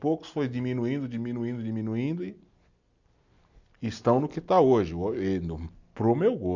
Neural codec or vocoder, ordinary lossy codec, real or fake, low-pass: none; none; real; 7.2 kHz